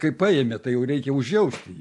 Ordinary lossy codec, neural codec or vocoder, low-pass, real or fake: AAC, 64 kbps; none; 10.8 kHz; real